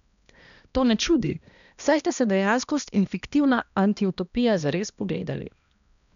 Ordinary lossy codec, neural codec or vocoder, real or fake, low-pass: none; codec, 16 kHz, 1 kbps, X-Codec, HuBERT features, trained on balanced general audio; fake; 7.2 kHz